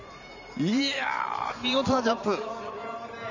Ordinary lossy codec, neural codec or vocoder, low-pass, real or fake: none; vocoder, 44.1 kHz, 128 mel bands every 512 samples, BigVGAN v2; 7.2 kHz; fake